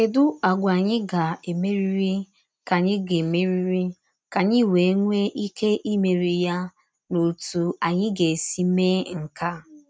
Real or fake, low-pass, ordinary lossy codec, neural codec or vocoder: real; none; none; none